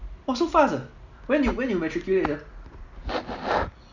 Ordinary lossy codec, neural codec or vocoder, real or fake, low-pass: none; none; real; 7.2 kHz